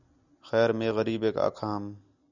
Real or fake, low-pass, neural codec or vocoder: real; 7.2 kHz; none